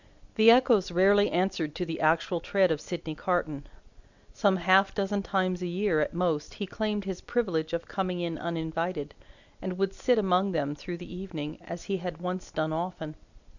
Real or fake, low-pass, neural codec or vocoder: real; 7.2 kHz; none